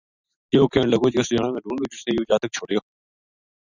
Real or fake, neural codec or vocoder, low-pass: fake; vocoder, 44.1 kHz, 128 mel bands every 512 samples, BigVGAN v2; 7.2 kHz